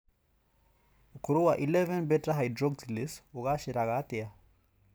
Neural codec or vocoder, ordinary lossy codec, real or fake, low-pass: none; none; real; none